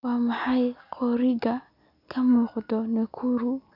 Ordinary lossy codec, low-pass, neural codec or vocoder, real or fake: none; 5.4 kHz; none; real